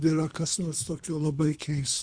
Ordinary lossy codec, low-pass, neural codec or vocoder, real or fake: Opus, 64 kbps; 9.9 kHz; codec, 24 kHz, 3 kbps, HILCodec; fake